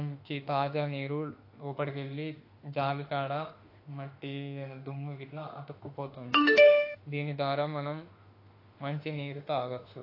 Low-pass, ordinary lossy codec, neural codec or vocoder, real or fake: 5.4 kHz; AAC, 32 kbps; autoencoder, 48 kHz, 32 numbers a frame, DAC-VAE, trained on Japanese speech; fake